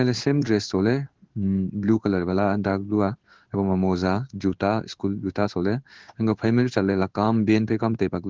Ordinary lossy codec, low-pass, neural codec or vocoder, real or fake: Opus, 16 kbps; 7.2 kHz; codec, 16 kHz in and 24 kHz out, 1 kbps, XY-Tokenizer; fake